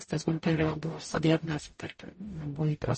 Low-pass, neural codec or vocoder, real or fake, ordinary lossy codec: 10.8 kHz; codec, 44.1 kHz, 0.9 kbps, DAC; fake; MP3, 32 kbps